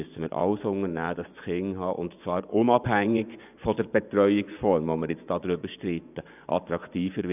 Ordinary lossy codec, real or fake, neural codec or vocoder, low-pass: none; fake; vocoder, 24 kHz, 100 mel bands, Vocos; 3.6 kHz